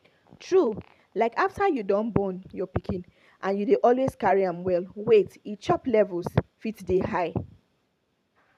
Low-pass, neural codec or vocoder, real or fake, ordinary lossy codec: 14.4 kHz; vocoder, 44.1 kHz, 128 mel bands every 512 samples, BigVGAN v2; fake; none